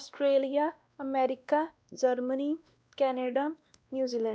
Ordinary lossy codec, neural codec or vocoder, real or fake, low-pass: none; codec, 16 kHz, 1 kbps, X-Codec, WavLM features, trained on Multilingual LibriSpeech; fake; none